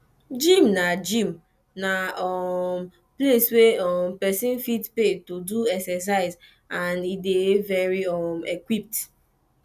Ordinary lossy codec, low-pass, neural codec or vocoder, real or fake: none; 14.4 kHz; none; real